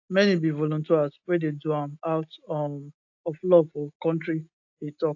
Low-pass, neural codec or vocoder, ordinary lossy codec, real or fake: 7.2 kHz; none; AAC, 48 kbps; real